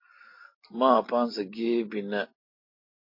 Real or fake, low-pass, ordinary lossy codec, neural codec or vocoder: real; 5.4 kHz; MP3, 32 kbps; none